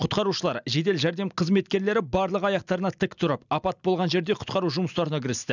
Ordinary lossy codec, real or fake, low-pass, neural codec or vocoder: none; real; 7.2 kHz; none